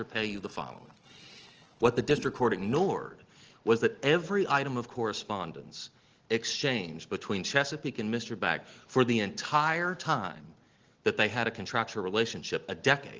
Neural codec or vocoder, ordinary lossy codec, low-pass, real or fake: none; Opus, 16 kbps; 7.2 kHz; real